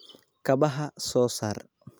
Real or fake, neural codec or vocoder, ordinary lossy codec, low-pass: real; none; none; none